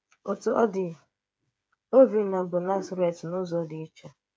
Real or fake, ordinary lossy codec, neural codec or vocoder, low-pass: fake; none; codec, 16 kHz, 8 kbps, FreqCodec, smaller model; none